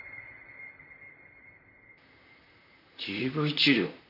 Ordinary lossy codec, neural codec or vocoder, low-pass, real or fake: none; vocoder, 44.1 kHz, 128 mel bands, Pupu-Vocoder; 5.4 kHz; fake